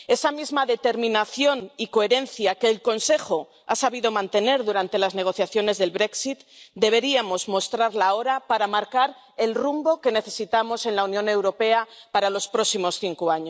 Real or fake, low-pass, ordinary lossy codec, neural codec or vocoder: real; none; none; none